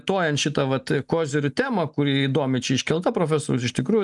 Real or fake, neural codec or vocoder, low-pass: real; none; 10.8 kHz